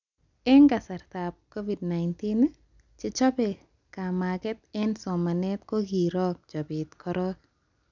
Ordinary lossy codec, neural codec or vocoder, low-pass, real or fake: none; none; 7.2 kHz; real